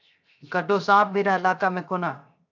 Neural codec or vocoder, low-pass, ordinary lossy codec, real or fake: codec, 16 kHz, 0.7 kbps, FocalCodec; 7.2 kHz; AAC, 48 kbps; fake